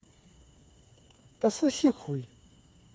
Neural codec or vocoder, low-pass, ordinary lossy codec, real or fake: codec, 16 kHz, 4 kbps, FreqCodec, smaller model; none; none; fake